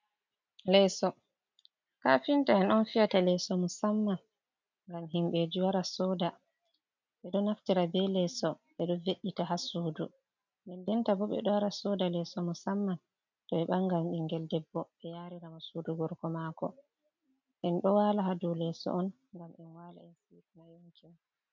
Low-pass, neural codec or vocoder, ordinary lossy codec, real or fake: 7.2 kHz; none; MP3, 64 kbps; real